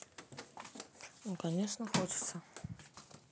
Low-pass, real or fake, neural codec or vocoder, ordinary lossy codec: none; real; none; none